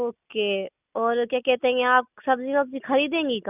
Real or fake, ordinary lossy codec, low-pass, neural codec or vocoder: real; none; 3.6 kHz; none